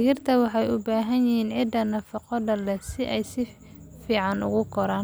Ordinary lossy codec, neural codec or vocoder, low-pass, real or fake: none; none; none; real